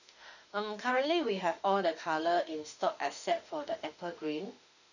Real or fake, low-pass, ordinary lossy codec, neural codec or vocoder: fake; 7.2 kHz; none; autoencoder, 48 kHz, 32 numbers a frame, DAC-VAE, trained on Japanese speech